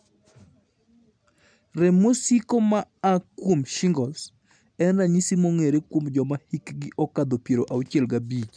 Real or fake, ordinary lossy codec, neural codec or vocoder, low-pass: real; none; none; 9.9 kHz